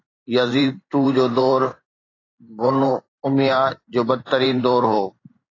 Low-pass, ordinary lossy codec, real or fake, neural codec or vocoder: 7.2 kHz; AAC, 32 kbps; fake; vocoder, 44.1 kHz, 128 mel bands every 512 samples, BigVGAN v2